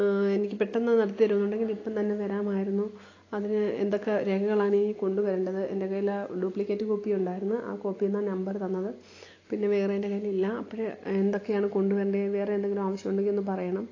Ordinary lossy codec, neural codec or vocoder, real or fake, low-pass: AAC, 32 kbps; none; real; 7.2 kHz